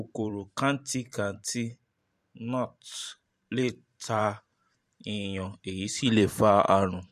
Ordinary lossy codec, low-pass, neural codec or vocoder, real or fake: MP3, 64 kbps; 14.4 kHz; vocoder, 44.1 kHz, 128 mel bands every 512 samples, BigVGAN v2; fake